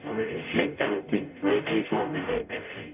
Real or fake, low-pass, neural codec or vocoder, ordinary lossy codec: fake; 3.6 kHz; codec, 44.1 kHz, 0.9 kbps, DAC; none